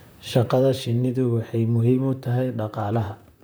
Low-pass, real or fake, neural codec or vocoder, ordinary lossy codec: none; fake; vocoder, 44.1 kHz, 128 mel bands, Pupu-Vocoder; none